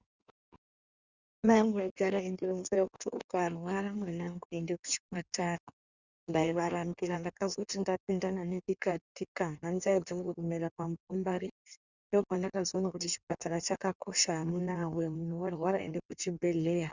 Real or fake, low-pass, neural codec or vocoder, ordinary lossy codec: fake; 7.2 kHz; codec, 16 kHz in and 24 kHz out, 1.1 kbps, FireRedTTS-2 codec; Opus, 64 kbps